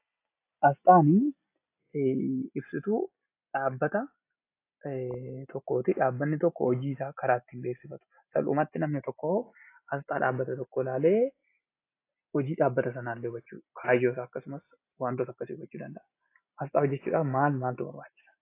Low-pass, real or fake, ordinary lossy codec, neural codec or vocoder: 3.6 kHz; real; AAC, 24 kbps; none